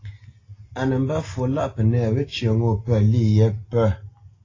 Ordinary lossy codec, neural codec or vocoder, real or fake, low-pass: AAC, 32 kbps; none; real; 7.2 kHz